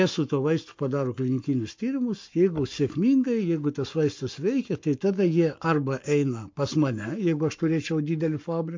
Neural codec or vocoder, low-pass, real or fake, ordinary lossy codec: codec, 44.1 kHz, 7.8 kbps, Pupu-Codec; 7.2 kHz; fake; MP3, 48 kbps